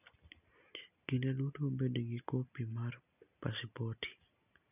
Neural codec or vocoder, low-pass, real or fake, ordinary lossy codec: none; 3.6 kHz; real; none